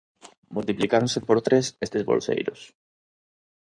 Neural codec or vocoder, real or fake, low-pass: codec, 16 kHz in and 24 kHz out, 2.2 kbps, FireRedTTS-2 codec; fake; 9.9 kHz